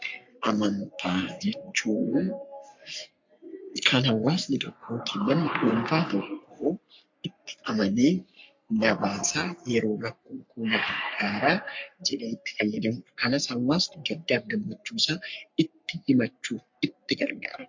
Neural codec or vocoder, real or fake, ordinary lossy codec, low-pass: codec, 44.1 kHz, 3.4 kbps, Pupu-Codec; fake; MP3, 48 kbps; 7.2 kHz